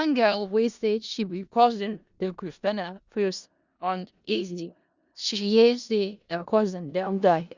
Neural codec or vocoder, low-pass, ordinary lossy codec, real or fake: codec, 16 kHz in and 24 kHz out, 0.4 kbps, LongCat-Audio-Codec, four codebook decoder; 7.2 kHz; Opus, 64 kbps; fake